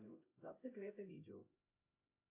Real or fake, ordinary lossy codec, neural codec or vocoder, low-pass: fake; AAC, 32 kbps; codec, 16 kHz, 0.5 kbps, X-Codec, HuBERT features, trained on LibriSpeech; 3.6 kHz